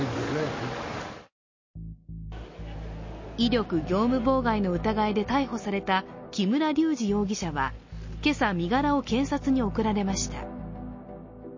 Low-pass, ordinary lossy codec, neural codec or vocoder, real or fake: 7.2 kHz; MP3, 32 kbps; none; real